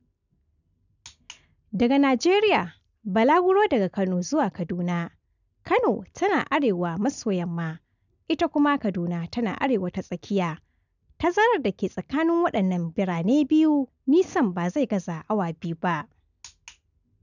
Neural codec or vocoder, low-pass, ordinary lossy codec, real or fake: none; 7.2 kHz; none; real